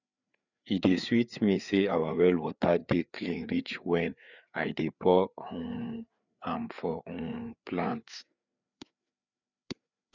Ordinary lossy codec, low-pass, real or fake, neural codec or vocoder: none; 7.2 kHz; fake; codec, 16 kHz, 4 kbps, FreqCodec, larger model